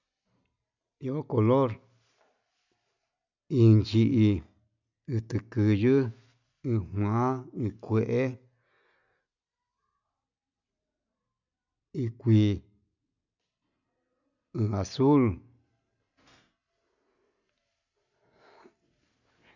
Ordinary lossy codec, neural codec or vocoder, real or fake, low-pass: none; none; real; 7.2 kHz